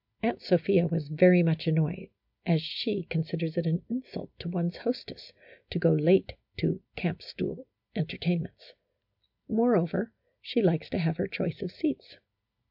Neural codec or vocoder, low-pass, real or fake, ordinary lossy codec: none; 5.4 kHz; real; MP3, 48 kbps